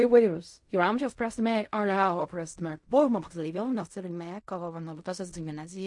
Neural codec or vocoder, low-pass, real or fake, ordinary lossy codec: codec, 16 kHz in and 24 kHz out, 0.4 kbps, LongCat-Audio-Codec, fine tuned four codebook decoder; 10.8 kHz; fake; MP3, 48 kbps